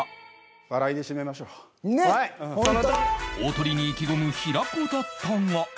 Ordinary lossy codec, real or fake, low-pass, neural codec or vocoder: none; real; none; none